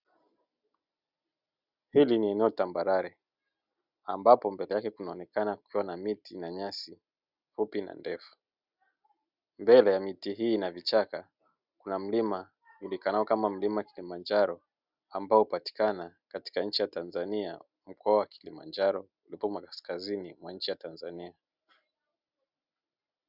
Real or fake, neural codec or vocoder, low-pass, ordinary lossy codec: real; none; 5.4 kHz; Opus, 64 kbps